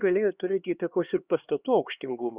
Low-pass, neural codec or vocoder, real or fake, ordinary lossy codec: 3.6 kHz; codec, 16 kHz, 2 kbps, X-Codec, HuBERT features, trained on LibriSpeech; fake; Opus, 64 kbps